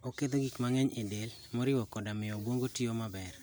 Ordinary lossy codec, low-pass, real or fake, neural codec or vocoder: none; none; real; none